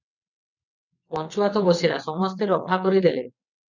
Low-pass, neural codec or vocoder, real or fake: 7.2 kHz; vocoder, 22.05 kHz, 80 mel bands, WaveNeXt; fake